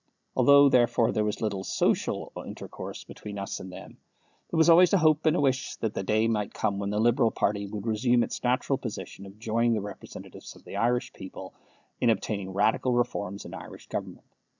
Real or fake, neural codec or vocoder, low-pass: real; none; 7.2 kHz